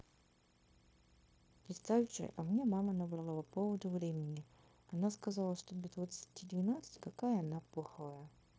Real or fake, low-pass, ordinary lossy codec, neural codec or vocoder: fake; none; none; codec, 16 kHz, 0.9 kbps, LongCat-Audio-Codec